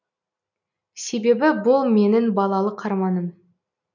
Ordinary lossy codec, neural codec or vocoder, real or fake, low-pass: none; none; real; 7.2 kHz